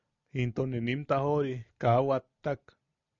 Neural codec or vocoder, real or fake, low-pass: none; real; 7.2 kHz